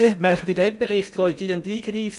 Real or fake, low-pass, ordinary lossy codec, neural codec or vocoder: fake; 10.8 kHz; none; codec, 16 kHz in and 24 kHz out, 0.6 kbps, FocalCodec, streaming, 4096 codes